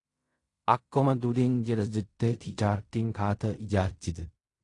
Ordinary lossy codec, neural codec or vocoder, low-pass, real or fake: AAC, 48 kbps; codec, 16 kHz in and 24 kHz out, 0.4 kbps, LongCat-Audio-Codec, fine tuned four codebook decoder; 10.8 kHz; fake